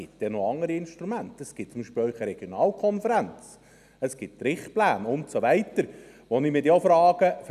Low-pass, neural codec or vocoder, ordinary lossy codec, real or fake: 14.4 kHz; none; none; real